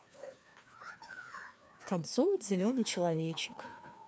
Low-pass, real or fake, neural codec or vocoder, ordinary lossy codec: none; fake; codec, 16 kHz, 2 kbps, FreqCodec, larger model; none